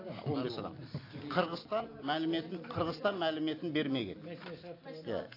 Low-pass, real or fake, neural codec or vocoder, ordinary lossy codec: 5.4 kHz; real; none; AAC, 32 kbps